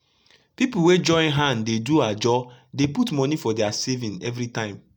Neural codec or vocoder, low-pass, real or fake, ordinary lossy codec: none; 19.8 kHz; real; none